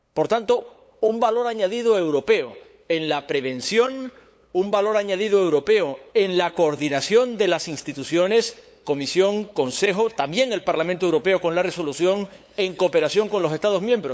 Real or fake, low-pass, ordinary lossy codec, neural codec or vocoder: fake; none; none; codec, 16 kHz, 8 kbps, FunCodec, trained on LibriTTS, 25 frames a second